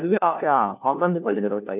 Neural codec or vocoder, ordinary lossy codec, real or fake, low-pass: codec, 16 kHz, 1 kbps, FunCodec, trained on LibriTTS, 50 frames a second; none; fake; 3.6 kHz